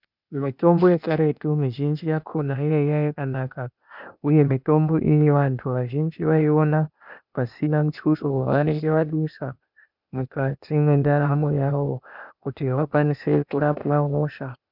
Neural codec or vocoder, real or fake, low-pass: codec, 16 kHz, 0.8 kbps, ZipCodec; fake; 5.4 kHz